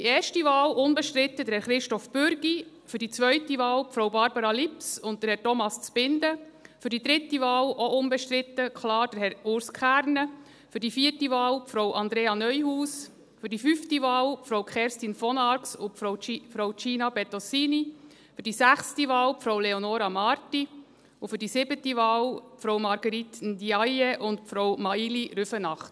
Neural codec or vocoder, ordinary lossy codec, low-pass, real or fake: none; none; none; real